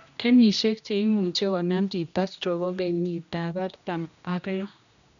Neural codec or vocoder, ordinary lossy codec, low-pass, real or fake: codec, 16 kHz, 1 kbps, X-Codec, HuBERT features, trained on general audio; none; 7.2 kHz; fake